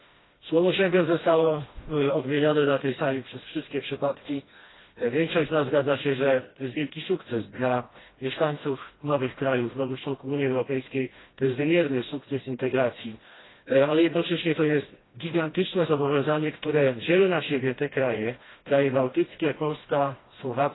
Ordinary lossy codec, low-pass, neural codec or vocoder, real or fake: AAC, 16 kbps; 7.2 kHz; codec, 16 kHz, 1 kbps, FreqCodec, smaller model; fake